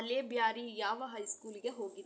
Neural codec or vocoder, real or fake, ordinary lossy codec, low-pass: none; real; none; none